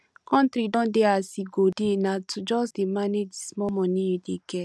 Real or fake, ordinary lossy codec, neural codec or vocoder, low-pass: real; none; none; none